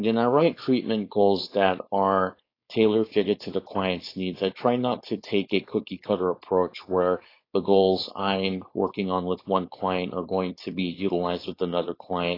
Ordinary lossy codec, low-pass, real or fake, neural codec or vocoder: AAC, 32 kbps; 5.4 kHz; fake; codec, 16 kHz, 4.8 kbps, FACodec